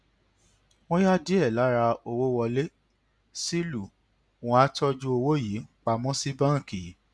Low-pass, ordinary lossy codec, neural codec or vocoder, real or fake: none; none; none; real